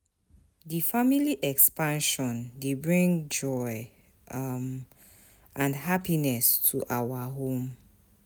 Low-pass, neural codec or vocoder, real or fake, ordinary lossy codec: none; none; real; none